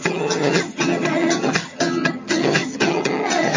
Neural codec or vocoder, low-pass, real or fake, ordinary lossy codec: vocoder, 22.05 kHz, 80 mel bands, HiFi-GAN; 7.2 kHz; fake; MP3, 32 kbps